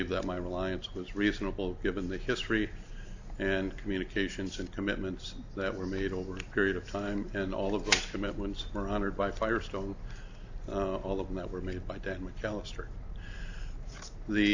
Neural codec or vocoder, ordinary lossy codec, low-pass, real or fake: none; AAC, 48 kbps; 7.2 kHz; real